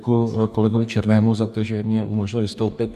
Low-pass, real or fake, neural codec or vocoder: 14.4 kHz; fake; codec, 44.1 kHz, 2.6 kbps, DAC